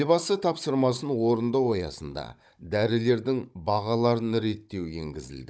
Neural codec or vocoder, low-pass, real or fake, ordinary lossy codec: codec, 16 kHz, 16 kbps, FunCodec, trained on Chinese and English, 50 frames a second; none; fake; none